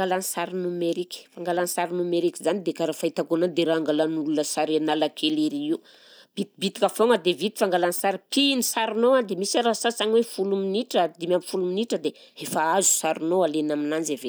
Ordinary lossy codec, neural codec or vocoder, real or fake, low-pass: none; none; real; none